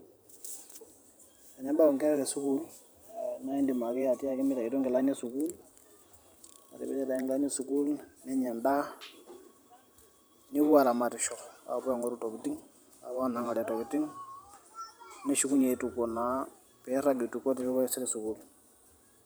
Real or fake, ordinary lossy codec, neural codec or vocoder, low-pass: fake; none; vocoder, 44.1 kHz, 128 mel bands every 512 samples, BigVGAN v2; none